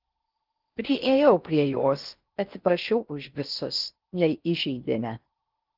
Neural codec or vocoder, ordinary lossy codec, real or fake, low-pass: codec, 16 kHz in and 24 kHz out, 0.6 kbps, FocalCodec, streaming, 4096 codes; Opus, 32 kbps; fake; 5.4 kHz